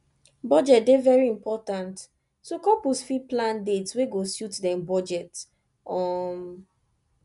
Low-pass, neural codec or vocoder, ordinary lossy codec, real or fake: 10.8 kHz; none; none; real